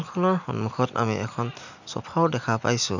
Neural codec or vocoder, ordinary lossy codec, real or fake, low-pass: none; none; real; 7.2 kHz